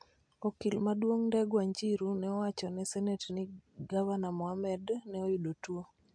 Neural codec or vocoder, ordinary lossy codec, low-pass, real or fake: none; MP3, 64 kbps; 9.9 kHz; real